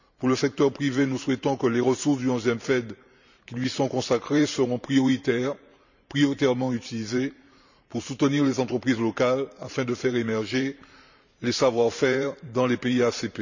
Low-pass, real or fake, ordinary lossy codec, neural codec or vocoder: 7.2 kHz; fake; none; vocoder, 44.1 kHz, 128 mel bands every 512 samples, BigVGAN v2